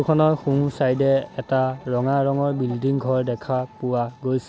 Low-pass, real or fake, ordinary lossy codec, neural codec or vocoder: none; real; none; none